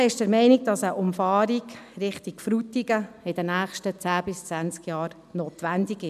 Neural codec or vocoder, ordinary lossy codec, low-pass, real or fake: none; none; 14.4 kHz; real